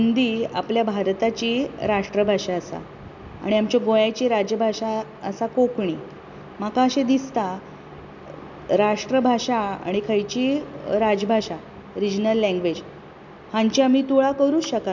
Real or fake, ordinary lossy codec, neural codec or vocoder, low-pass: real; none; none; 7.2 kHz